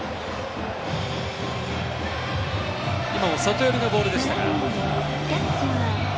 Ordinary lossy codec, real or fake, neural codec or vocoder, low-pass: none; real; none; none